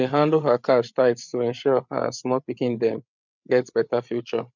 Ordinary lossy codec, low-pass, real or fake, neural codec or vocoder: none; 7.2 kHz; fake; codec, 16 kHz, 8 kbps, FreqCodec, larger model